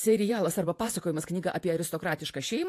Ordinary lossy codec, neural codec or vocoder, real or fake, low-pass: AAC, 64 kbps; vocoder, 48 kHz, 128 mel bands, Vocos; fake; 14.4 kHz